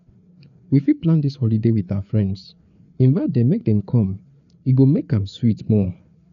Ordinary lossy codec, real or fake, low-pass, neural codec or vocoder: none; fake; 7.2 kHz; codec, 16 kHz, 4 kbps, FreqCodec, larger model